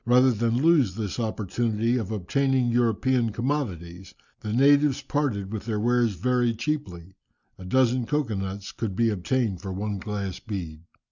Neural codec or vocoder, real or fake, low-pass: none; real; 7.2 kHz